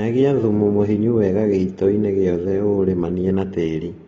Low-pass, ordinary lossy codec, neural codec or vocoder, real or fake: 19.8 kHz; AAC, 24 kbps; none; real